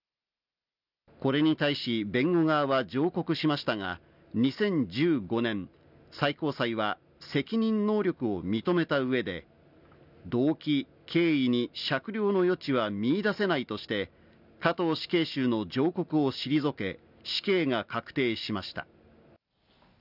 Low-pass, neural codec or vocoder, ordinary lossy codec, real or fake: 5.4 kHz; none; none; real